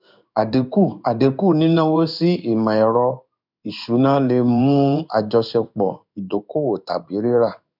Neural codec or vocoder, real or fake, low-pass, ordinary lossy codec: codec, 16 kHz in and 24 kHz out, 1 kbps, XY-Tokenizer; fake; 5.4 kHz; none